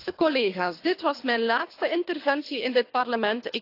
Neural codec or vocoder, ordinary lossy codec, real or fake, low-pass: codec, 24 kHz, 3 kbps, HILCodec; AAC, 32 kbps; fake; 5.4 kHz